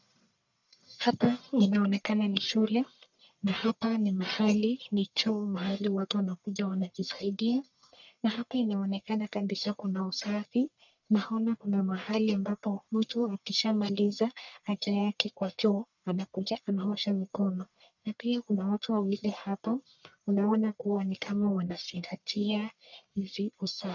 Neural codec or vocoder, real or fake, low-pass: codec, 44.1 kHz, 1.7 kbps, Pupu-Codec; fake; 7.2 kHz